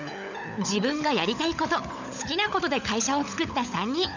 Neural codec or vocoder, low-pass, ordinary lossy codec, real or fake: codec, 16 kHz, 16 kbps, FunCodec, trained on LibriTTS, 50 frames a second; 7.2 kHz; none; fake